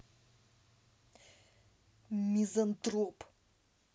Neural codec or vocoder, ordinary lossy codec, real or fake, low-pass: none; none; real; none